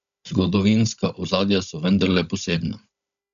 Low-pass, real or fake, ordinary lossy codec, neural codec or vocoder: 7.2 kHz; fake; none; codec, 16 kHz, 16 kbps, FunCodec, trained on Chinese and English, 50 frames a second